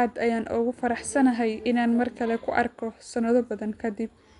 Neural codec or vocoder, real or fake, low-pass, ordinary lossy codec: none; real; 10.8 kHz; none